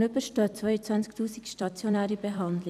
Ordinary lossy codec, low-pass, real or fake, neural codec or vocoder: none; 14.4 kHz; fake; vocoder, 44.1 kHz, 128 mel bands every 256 samples, BigVGAN v2